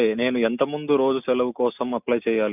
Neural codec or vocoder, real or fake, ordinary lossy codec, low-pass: none; real; none; 3.6 kHz